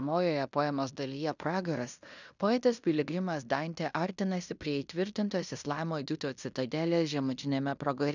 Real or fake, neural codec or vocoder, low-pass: fake; codec, 16 kHz in and 24 kHz out, 0.9 kbps, LongCat-Audio-Codec, fine tuned four codebook decoder; 7.2 kHz